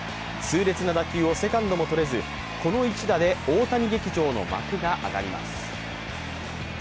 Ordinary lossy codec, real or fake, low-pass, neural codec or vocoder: none; real; none; none